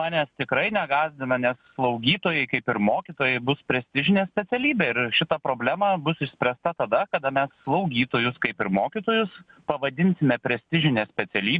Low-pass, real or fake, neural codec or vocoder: 9.9 kHz; real; none